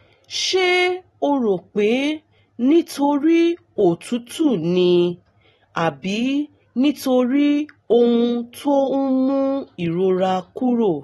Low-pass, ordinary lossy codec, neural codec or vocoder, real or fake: 19.8 kHz; AAC, 32 kbps; none; real